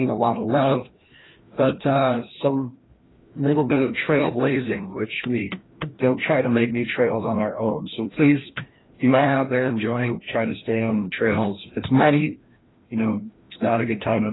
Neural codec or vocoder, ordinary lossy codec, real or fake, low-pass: codec, 16 kHz, 1 kbps, FreqCodec, larger model; AAC, 16 kbps; fake; 7.2 kHz